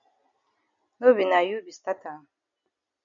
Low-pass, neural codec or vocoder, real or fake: 7.2 kHz; none; real